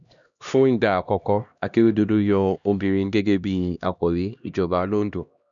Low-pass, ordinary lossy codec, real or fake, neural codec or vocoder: 7.2 kHz; none; fake; codec, 16 kHz, 1 kbps, X-Codec, HuBERT features, trained on LibriSpeech